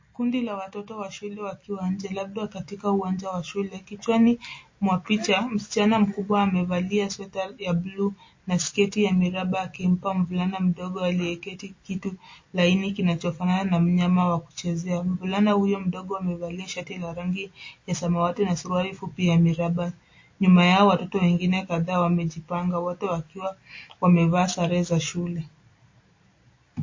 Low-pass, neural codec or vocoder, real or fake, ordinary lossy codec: 7.2 kHz; none; real; MP3, 32 kbps